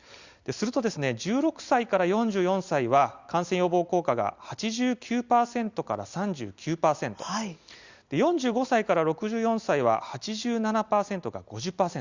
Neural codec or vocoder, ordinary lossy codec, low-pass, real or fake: none; Opus, 64 kbps; 7.2 kHz; real